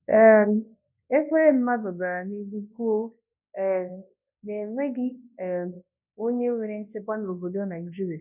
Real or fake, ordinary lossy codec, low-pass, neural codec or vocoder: fake; none; 3.6 kHz; codec, 24 kHz, 0.9 kbps, WavTokenizer, large speech release